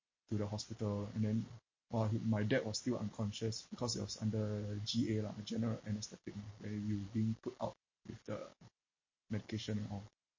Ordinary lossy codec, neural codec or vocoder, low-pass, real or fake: MP3, 32 kbps; none; 7.2 kHz; real